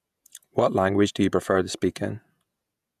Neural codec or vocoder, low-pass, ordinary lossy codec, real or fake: vocoder, 48 kHz, 128 mel bands, Vocos; 14.4 kHz; none; fake